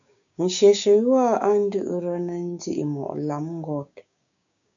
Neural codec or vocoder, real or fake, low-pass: codec, 16 kHz, 6 kbps, DAC; fake; 7.2 kHz